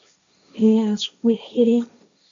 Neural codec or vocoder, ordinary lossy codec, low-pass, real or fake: codec, 16 kHz, 1.1 kbps, Voila-Tokenizer; MP3, 64 kbps; 7.2 kHz; fake